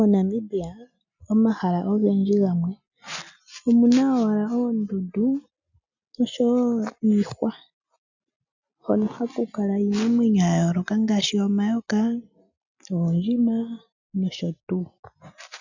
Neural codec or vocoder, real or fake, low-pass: none; real; 7.2 kHz